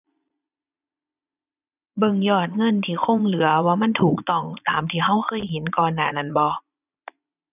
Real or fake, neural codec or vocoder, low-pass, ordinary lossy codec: fake; vocoder, 22.05 kHz, 80 mel bands, Vocos; 3.6 kHz; none